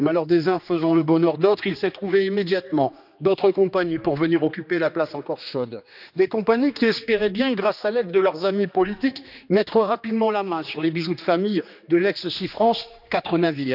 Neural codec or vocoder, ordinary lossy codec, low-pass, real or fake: codec, 16 kHz, 2 kbps, X-Codec, HuBERT features, trained on general audio; none; 5.4 kHz; fake